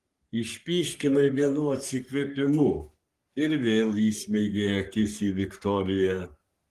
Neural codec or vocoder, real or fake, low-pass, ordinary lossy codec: codec, 44.1 kHz, 3.4 kbps, Pupu-Codec; fake; 14.4 kHz; Opus, 24 kbps